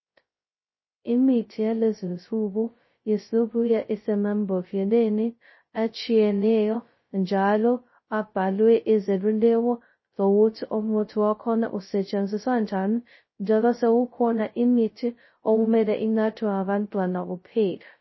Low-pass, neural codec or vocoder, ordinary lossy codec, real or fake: 7.2 kHz; codec, 16 kHz, 0.2 kbps, FocalCodec; MP3, 24 kbps; fake